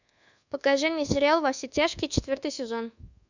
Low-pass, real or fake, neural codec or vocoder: 7.2 kHz; fake; codec, 24 kHz, 1.2 kbps, DualCodec